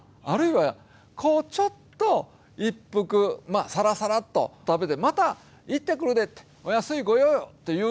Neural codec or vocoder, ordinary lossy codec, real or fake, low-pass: none; none; real; none